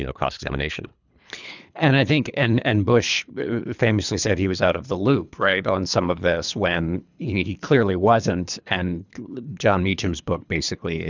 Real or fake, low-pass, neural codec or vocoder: fake; 7.2 kHz; codec, 24 kHz, 3 kbps, HILCodec